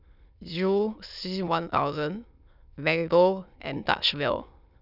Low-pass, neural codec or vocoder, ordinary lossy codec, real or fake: 5.4 kHz; autoencoder, 22.05 kHz, a latent of 192 numbers a frame, VITS, trained on many speakers; none; fake